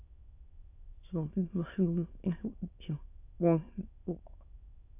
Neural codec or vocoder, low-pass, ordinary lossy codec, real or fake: autoencoder, 22.05 kHz, a latent of 192 numbers a frame, VITS, trained on many speakers; 3.6 kHz; AAC, 32 kbps; fake